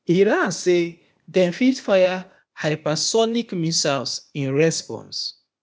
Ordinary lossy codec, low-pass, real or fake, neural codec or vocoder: none; none; fake; codec, 16 kHz, 0.8 kbps, ZipCodec